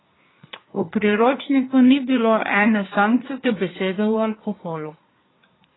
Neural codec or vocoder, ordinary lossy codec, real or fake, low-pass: codec, 24 kHz, 1 kbps, SNAC; AAC, 16 kbps; fake; 7.2 kHz